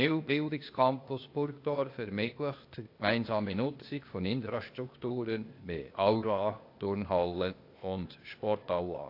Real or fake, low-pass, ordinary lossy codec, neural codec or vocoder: fake; 5.4 kHz; AAC, 32 kbps; codec, 16 kHz, 0.8 kbps, ZipCodec